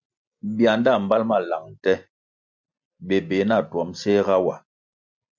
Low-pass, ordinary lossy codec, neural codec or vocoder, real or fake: 7.2 kHz; MP3, 64 kbps; none; real